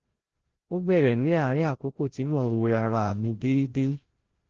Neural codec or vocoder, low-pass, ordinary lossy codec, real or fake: codec, 16 kHz, 0.5 kbps, FreqCodec, larger model; 7.2 kHz; Opus, 16 kbps; fake